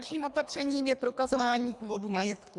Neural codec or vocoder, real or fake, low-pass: codec, 24 kHz, 1.5 kbps, HILCodec; fake; 10.8 kHz